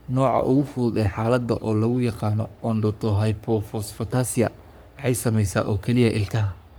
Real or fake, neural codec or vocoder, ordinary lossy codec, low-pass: fake; codec, 44.1 kHz, 3.4 kbps, Pupu-Codec; none; none